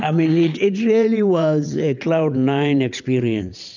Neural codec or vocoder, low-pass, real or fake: vocoder, 22.05 kHz, 80 mel bands, WaveNeXt; 7.2 kHz; fake